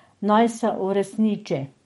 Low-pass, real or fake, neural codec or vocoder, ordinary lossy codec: 19.8 kHz; fake; codec, 44.1 kHz, 7.8 kbps, DAC; MP3, 48 kbps